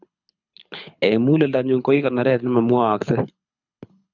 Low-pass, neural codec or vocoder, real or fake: 7.2 kHz; codec, 24 kHz, 6 kbps, HILCodec; fake